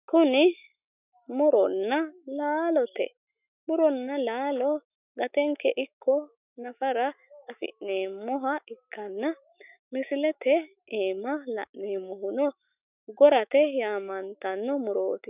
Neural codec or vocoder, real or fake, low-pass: autoencoder, 48 kHz, 128 numbers a frame, DAC-VAE, trained on Japanese speech; fake; 3.6 kHz